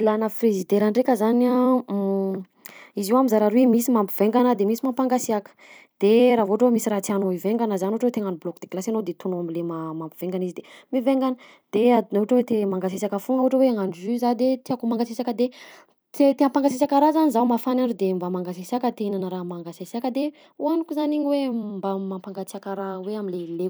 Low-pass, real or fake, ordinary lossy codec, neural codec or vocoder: none; fake; none; vocoder, 44.1 kHz, 128 mel bands every 256 samples, BigVGAN v2